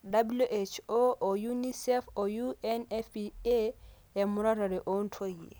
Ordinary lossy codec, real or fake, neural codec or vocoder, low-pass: none; real; none; none